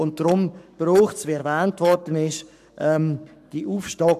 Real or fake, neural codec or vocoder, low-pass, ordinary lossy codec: fake; codec, 44.1 kHz, 7.8 kbps, Pupu-Codec; 14.4 kHz; none